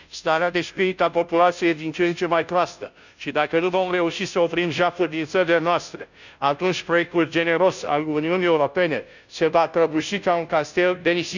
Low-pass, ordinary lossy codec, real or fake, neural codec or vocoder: 7.2 kHz; none; fake; codec, 16 kHz, 0.5 kbps, FunCodec, trained on Chinese and English, 25 frames a second